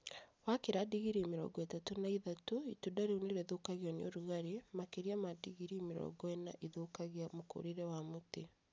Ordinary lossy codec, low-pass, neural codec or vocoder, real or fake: none; none; none; real